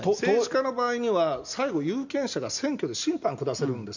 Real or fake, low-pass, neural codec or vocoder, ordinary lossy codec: real; 7.2 kHz; none; none